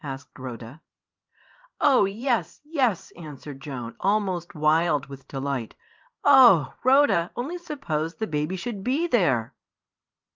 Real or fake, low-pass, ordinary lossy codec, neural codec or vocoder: fake; 7.2 kHz; Opus, 24 kbps; vocoder, 44.1 kHz, 128 mel bands every 512 samples, BigVGAN v2